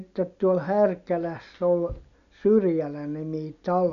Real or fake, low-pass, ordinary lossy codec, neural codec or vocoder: real; 7.2 kHz; none; none